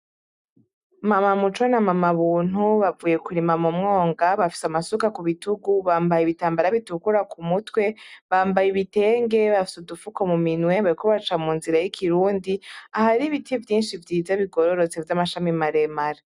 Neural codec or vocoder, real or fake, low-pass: none; real; 10.8 kHz